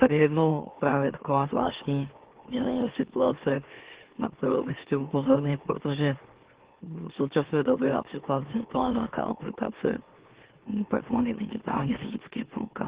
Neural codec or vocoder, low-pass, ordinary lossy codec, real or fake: autoencoder, 44.1 kHz, a latent of 192 numbers a frame, MeloTTS; 3.6 kHz; Opus, 16 kbps; fake